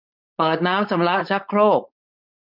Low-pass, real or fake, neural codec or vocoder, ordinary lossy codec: 5.4 kHz; fake; codec, 16 kHz, 4.8 kbps, FACodec; none